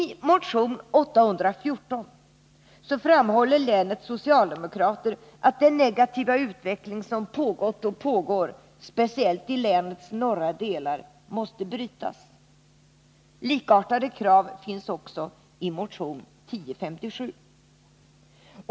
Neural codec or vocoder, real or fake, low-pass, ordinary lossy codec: none; real; none; none